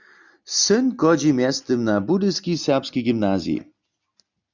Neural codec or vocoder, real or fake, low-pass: none; real; 7.2 kHz